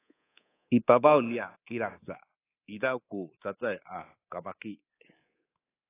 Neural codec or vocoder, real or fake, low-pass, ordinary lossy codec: codec, 24 kHz, 3.1 kbps, DualCodec; fake; 3.6 kHz; AAC, 16 kbps